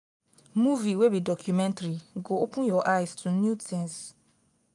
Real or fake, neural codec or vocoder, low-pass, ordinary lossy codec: fake; vocoder, 24 kHz, 100 mel bands, Vocos; 10.8 kHz; none